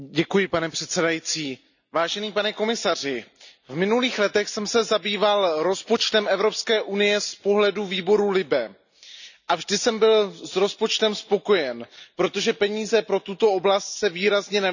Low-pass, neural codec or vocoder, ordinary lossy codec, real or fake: 7.2 kHz; none; none; real